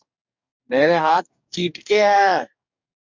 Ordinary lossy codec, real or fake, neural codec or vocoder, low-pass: MP3, 64 kbps; fake; codec, 44.1 kHz, 2.6 kbps, DAC; 7.2 kHz